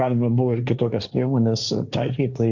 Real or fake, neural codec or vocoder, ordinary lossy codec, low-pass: fake; codec, 16 kHz, 1.1 kbps, Voila-Tokenizer; Opus, 64 kbps; 7.2 kHz